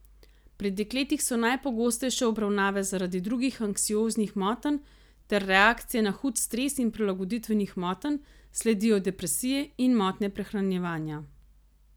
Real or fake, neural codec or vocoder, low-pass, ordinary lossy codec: real; none; none; none